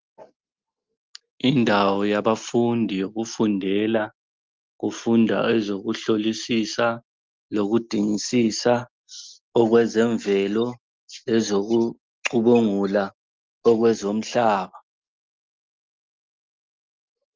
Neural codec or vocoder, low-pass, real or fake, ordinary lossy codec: none; 7.2 kHz; real; Opus, 32 kbps